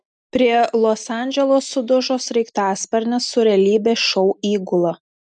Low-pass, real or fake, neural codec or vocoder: 10.8 kHz; real; none